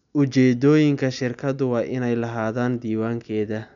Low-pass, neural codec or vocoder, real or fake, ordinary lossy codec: 7.2 kHz; none; real; none